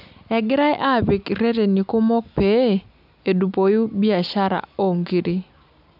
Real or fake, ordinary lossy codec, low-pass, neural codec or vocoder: real; none; 5.4 kHz; none